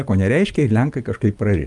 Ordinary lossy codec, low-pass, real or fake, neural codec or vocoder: Opus, 32 kbps; 10.8 kHz; real; none